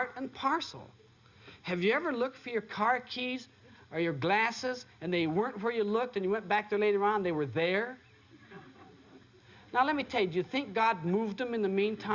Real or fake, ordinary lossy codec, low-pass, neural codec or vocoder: real; Opus, 64 kbps; 7.2 kHz; none